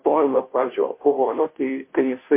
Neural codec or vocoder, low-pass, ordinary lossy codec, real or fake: codec, 16 kHz, 0.5 kbps, FunCodec, trained on Chinese and English, 25 frames a second; 3.6 kHz; MP3, 24 kbps; fake